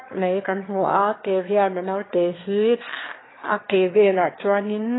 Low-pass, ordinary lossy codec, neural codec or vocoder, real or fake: 7.2 kHz; AAC, 16 kbps; autoencoder, 22.05 kHz, a latent of 192 numbers a frame, VITS, trained on one speaker; fake